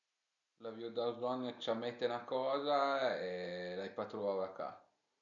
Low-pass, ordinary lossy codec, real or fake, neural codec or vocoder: 7.2 kHz; none; real; none